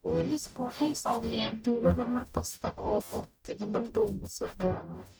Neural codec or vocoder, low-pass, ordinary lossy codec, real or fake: codec, 44.1 kHz, 0.9 kbps, DAC; none; none; fake